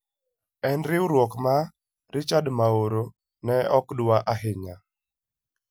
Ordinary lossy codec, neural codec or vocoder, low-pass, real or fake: none; none; none; real